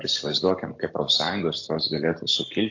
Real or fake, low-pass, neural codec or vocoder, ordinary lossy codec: real; 7.2 kHz; none; AAC, 48 kbps